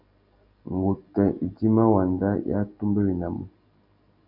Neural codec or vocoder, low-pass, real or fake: none; 5.4 kHz; real